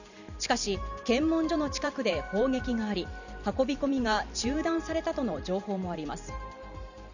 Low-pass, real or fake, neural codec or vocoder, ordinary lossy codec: 7.2 kHz; fake; vocoder, 44.1 kHz, 128 mel bands every 512 samples, BigVGAN v2; none